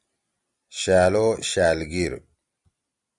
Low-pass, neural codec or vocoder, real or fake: 10.8 kHz; vocoder, 44.1 kHz, 128 mel bands every 256 samples, BigVGAN v2; fake